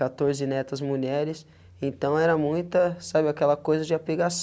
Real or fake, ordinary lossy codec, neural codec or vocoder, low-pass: real; none; none; none